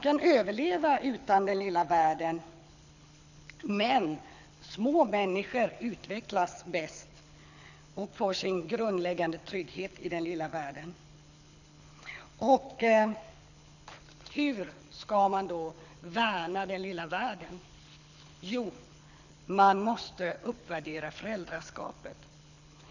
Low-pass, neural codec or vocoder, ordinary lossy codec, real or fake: 7.2 kHz; codec, 24 kHz, 6 kbps, HILCodec; none; fake